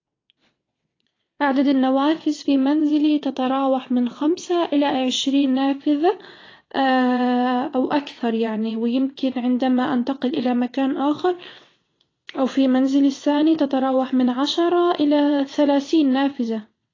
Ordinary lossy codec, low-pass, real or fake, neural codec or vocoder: AAC, 32 kbps; 7.2 kHz; fake; vocoder, 22.05 kHz, 80 mel bands, WaveNeXt